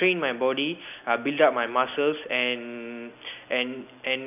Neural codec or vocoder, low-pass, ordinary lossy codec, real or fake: none; 3.6 kHz; none; real